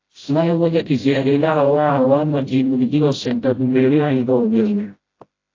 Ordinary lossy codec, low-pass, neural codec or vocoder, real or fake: AAC, 32 kbps; 7.2 kHz; codec, 16 kHz, 0.5 kbps, FreqCodec, smaller model; fake